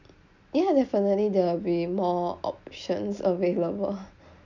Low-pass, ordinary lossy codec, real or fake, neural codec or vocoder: 7.2 kHz; none; real; none